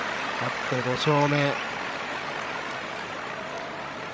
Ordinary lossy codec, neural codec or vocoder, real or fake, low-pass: none; codec, 16 kHz, 16 kbps, FreqCodec, larger model; fake; none